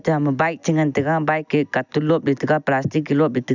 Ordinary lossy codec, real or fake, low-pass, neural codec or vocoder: none; real; 7.2 kHz; none